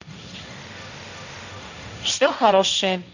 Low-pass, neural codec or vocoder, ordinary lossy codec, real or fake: 7.2 kHz; codec, 16 kHz, 1.1 kbps, Voila-Tokenizer; none; fake